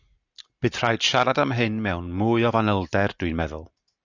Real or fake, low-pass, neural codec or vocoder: real; 7.2 kHz; none